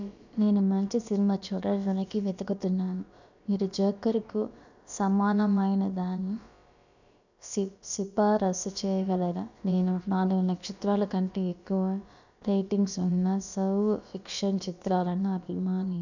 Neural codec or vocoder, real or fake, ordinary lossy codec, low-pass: codec, 16 kHz, about 1 kbps, DyCAST, with the encoder's durations; fake; none; 7.2 kHz